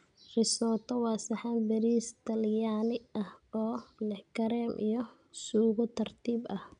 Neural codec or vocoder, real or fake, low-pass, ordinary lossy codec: none; real; 9.9 kHz; none